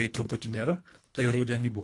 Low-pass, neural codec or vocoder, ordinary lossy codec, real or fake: 10.8 kHz; codec, 24 kHz, 1.5 kbps, HILCodec; AAC, 48 kbps; fake